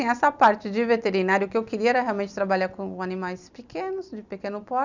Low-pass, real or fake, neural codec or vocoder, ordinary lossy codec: 7.2 kHz; real; none; none